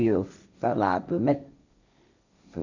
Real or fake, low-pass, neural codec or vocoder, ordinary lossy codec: fake; 7.2 kHz; codec, 16 kHz, 1.1 kbps, Voila-Tokenizer; none